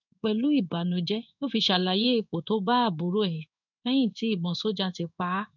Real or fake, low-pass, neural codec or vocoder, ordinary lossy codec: fake; 7.2 kHz; codec, 16 kHz in and 24 kHz out, 1 kbps, XY-Tokenizer; none